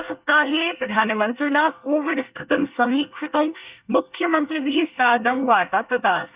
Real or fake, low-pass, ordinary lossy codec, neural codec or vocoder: fake; 3.6 kHz; Opus, 64 kbps; codec, 24 kHz, 1 kbps, SNAC